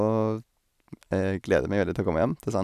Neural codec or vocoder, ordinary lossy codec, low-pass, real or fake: none; none; 14.4 kHz; real